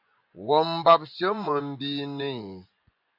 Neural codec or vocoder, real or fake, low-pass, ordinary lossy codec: vocoder, 24 kHz, 100 mel bands, Vocos; fake; 5.4 kHz; AAC, 48 kbps